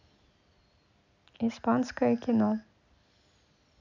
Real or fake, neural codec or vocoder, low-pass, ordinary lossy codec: real; none; 7.2 kHz; none